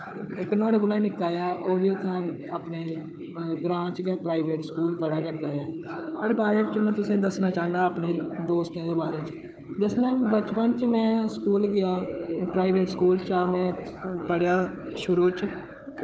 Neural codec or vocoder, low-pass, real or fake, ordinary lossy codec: codec, 16 kHz, 4 kbps, FunCodec, trained on Chinese and English, 50 frames a second; none; fake; none